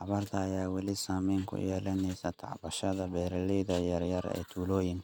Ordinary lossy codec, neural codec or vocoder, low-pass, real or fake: none; none; none; real